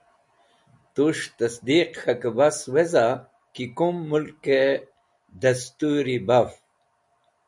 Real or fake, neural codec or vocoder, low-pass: real; none; 10.8 kHz